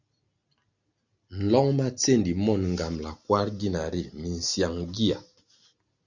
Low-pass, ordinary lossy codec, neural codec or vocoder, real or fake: 7.2 kHz; Opus, 64 kbps; none; real